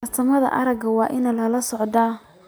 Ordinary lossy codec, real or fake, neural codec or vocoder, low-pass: none; real; none; none